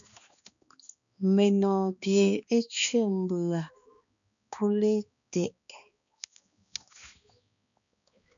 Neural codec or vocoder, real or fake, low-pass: codec, 16 kHz, 2 kbps, X-Codec, HuBERT features, trained on balanced general audio; fake; 7.2 kHz